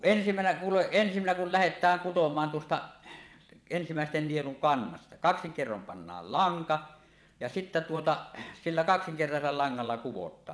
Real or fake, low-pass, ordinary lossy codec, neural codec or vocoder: fake; none; none; vocoder, 22.05 kHz, 80 mel bands, WaveNeXt